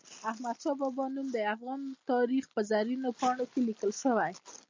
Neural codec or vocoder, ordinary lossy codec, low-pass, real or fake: none; MP3, 64 kbps; 7.2 kHz; real